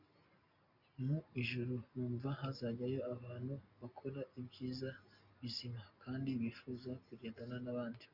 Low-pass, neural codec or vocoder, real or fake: 5.4 kHz; none; real